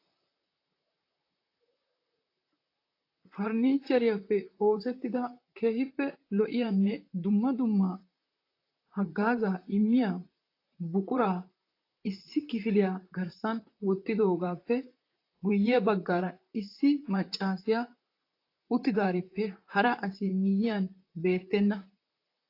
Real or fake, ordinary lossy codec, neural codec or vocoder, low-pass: fake; AAC, 32 kbps; vocoder, 44.1 kHz, 128 mel bands, Pupu-Vocoder; 5.4 kHz